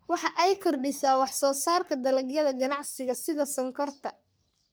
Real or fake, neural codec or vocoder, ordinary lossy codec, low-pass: fake; codec, 44.1 kHz, 3.4 kbps, Pupu-Codec; none; none